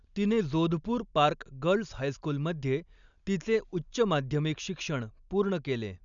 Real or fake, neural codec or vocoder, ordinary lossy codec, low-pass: real; none; none; 7.2 kHz